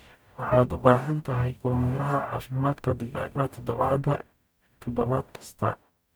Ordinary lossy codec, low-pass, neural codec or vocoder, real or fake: none; none; codec, 44.1 kHz, 0.9 kbps, DAC; fake